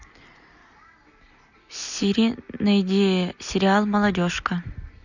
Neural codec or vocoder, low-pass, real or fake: none; 7.2 kHz; real